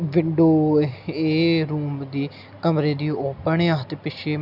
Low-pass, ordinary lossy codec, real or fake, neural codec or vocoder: 5.4 kHz; none; real; none